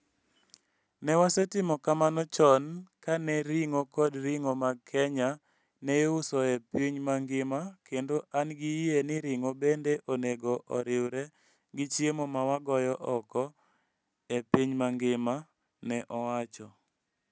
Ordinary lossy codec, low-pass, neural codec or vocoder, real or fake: none; none; none; real